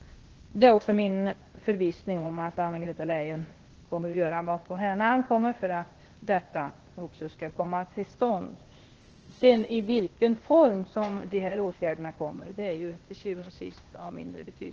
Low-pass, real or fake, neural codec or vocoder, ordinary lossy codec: 7.2 kHz; fake; codec, 16 kHz, 0.8 kbps, ZipCodec; Opus, 16 kbps